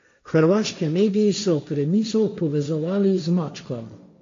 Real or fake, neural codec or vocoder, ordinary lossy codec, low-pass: fake; codec, 16 kHz, 1.1 kbps, Voila-Tokenizer; MP3, 48 kbps; 7.2 kHz